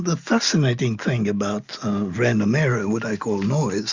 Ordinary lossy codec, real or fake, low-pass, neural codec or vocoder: Opus, 64 kbps; real; 7.2 kHz; none